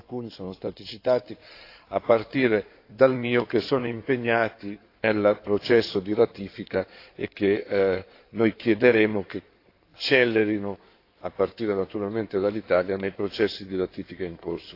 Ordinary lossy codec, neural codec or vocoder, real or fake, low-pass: AAC, 32 kbps; codec, 16 kHz in and 24 kHz out, 2.2 kbps, FireRedTTS-2 codec; fake; 5.4 kHz